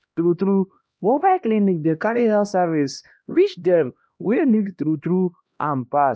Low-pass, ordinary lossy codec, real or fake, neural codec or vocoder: none; none; fake; codec, 16 kHz, 1 kbps, X-Codec, HuBERT features, trained on LibriSpeech